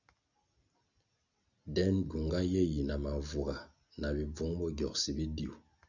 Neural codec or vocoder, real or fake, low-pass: none; real; 7.2 kHz